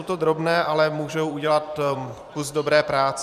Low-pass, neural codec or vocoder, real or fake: 14.4 kHz; vocoder, 44.1 kHz, 128 mel bands every 512 samples, BigVGAN v2; fake